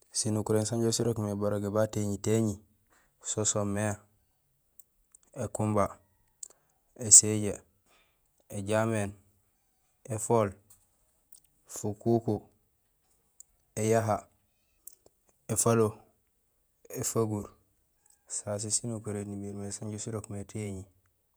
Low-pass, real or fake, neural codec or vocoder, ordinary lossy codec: none; fake; vocoder, 48 kHz, 128 mel bands, Vocos; none